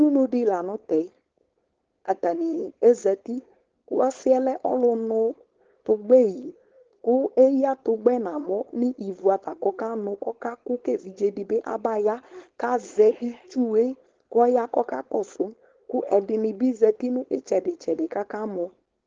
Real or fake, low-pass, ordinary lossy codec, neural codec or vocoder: fake; 7.2 kHz; Opus, 16 kbps; codec, 16 kHz, 4.8 kbps, FACodec